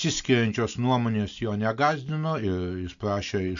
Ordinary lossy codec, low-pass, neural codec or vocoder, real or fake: MP3, 64 kbps; 7.2 kHz; none; real